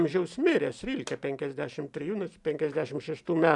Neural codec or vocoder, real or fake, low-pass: vocoder, 44.1 kHz, 128 mel bands every 512 samples, BigVGAN v2; fake; 10.8 kHz